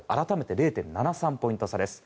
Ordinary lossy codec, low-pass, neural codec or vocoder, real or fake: none; none; none; real